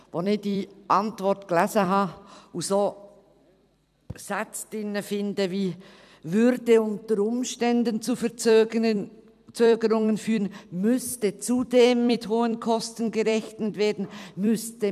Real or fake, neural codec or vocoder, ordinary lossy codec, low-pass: fake; vocoder, 44.1 kHz, 128 mel bands every 256 samples, BigVGAN v2; none; 14.4 kHz